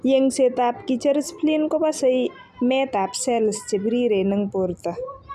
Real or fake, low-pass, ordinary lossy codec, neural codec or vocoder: real; 14.4 kHz; none; none